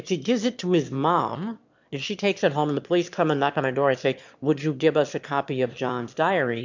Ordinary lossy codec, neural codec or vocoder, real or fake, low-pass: MP3, 64 kbps; autoencoder, 22.05 kHz, a latent of 192 numbers a frame, VITS, trained on one speaker; fake; 7.2 kHz